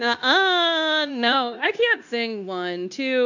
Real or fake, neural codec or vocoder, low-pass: fake; codec, 16 kHz, 0.9 kbps, LongCat-Audio-Codec; 7.2 kHz